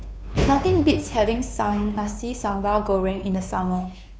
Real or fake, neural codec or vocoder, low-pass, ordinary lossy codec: fake; codec, 16 kHz, 2 kbps, FunCodec, trained on Chinese and English, 25 frames a second; none; none